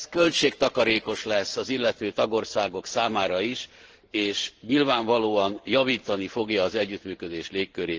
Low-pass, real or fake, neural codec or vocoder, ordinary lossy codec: 7.2 kHz; fake; vocoder, 44.1 kHz, 128 mel bands every 512 samples, BigVGAN v2; Opus, 16 kbps